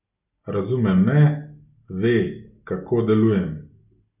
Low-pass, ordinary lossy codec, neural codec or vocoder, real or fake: 3.6 kHz; none; none; real